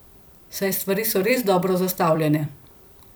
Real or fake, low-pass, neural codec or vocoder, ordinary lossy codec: fake; none; vocoder, 44.1 kHz, 128 mel bands every 256 samples, BigVGAN v2; none